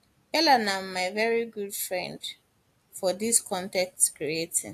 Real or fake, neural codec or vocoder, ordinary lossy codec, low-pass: real; none; AAC, 64 kbps; 14.4 kHz